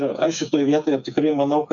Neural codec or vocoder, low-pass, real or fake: codec, 16 kHz, 4 kbps, FreqCodec, smaller model; 7.2 kHz; fake